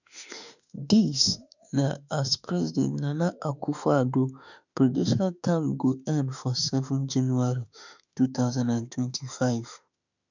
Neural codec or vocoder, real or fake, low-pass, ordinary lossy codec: autoencoder, 48 kHz, 32 numbers a frame, DAC-VAE, trained on Japanese speech; fake; 7.2 kHz; none